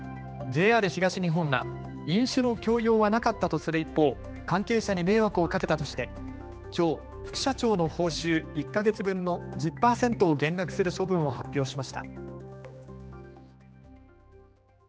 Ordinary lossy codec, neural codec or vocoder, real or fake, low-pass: none; codec, 16 kHz, 2 kbps, X-Codec, HuBERT features, trained on general audio; fake; none